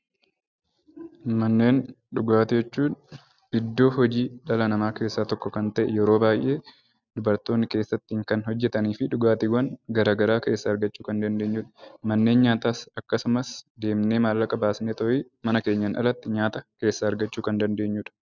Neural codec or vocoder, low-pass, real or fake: none; 7.2 kHz; real